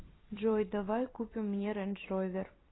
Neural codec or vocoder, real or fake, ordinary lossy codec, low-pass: vocoder, 44.1 kHz, 128 mel bands every 256 samples, BigVGAN v2; fake; AAC, 16 kbps; 7.2 kHz